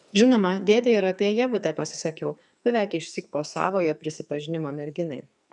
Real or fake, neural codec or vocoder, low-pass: fake; codec, 44.1 kHz, 2.6 kbps, SNAC; 10.8 kHz